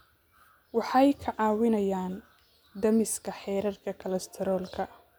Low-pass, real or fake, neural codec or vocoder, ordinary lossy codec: none; real; none; none